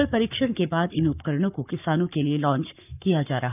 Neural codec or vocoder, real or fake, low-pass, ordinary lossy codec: codec, 44.1 kHz, 7.8 kbps, Pupu-Codec; fake; 3.6 kHz; none